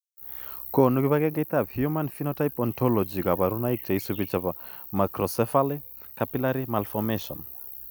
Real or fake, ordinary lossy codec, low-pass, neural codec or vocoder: real; none; none; none